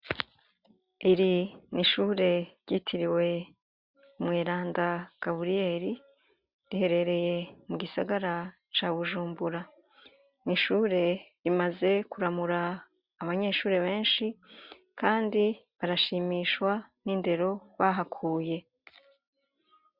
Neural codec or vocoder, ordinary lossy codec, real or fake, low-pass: none; Opus, 64 kbps; real; 5.4 kHz